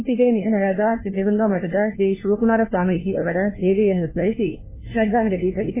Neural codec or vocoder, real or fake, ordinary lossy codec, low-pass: codec, 16 kHz, 1 kbps, FunCodec, trained on LibriTTS, 50 frames a second; fake; MP3, 16 kbps; 3.6 kHz